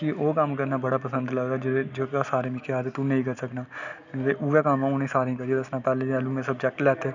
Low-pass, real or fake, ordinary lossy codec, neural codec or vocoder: 7.2 kHz; real; none; none